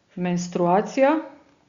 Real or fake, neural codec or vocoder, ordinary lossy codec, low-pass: real; none; Opus, 64 kbps; 7.2 kHz